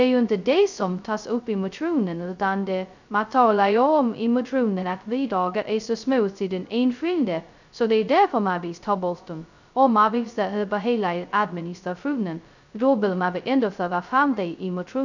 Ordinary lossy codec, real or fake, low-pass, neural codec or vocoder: none; fake; 7.2 kHz; codec, 16 kHz, 0.2 kbps, FocalCodec